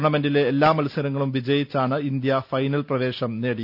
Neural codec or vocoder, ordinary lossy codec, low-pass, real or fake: none; none; 5.4 kHz; real